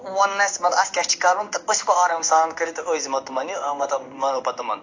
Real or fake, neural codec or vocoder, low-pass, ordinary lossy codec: fake; codec, 16 kHz in and 24 kHz out, 1 kbps, XY-Tokenizer; 7.2 kHz; AAC, 48 kbps